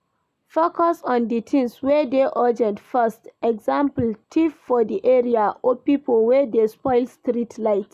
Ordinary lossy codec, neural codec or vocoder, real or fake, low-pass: none; none; real; 14.4 kHz